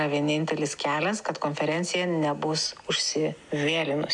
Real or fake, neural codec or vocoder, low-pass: real; none; 10.8 kHz